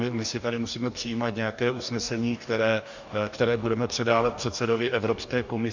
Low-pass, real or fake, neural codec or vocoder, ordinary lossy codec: 7.2 kHz; fake; codec, 44.1 kHz, 2.6 kbps, DAC; MP3, 64 kbps